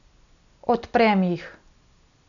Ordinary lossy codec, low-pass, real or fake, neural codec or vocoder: none; 7.2 kHz; real; none